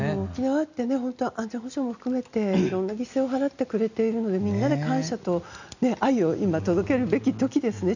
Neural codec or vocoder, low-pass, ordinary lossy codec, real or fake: none; 7.2 kHz; none; real